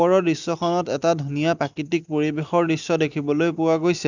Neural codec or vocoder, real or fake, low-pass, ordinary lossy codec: none; real; 7.2 kHz; none